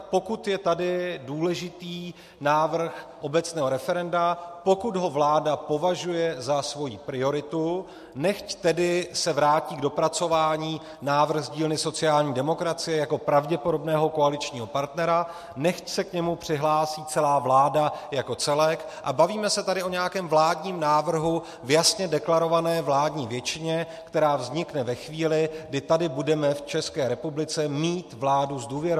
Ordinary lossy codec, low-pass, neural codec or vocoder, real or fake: MP3, 64 kbps; 14.4 kHz; none; real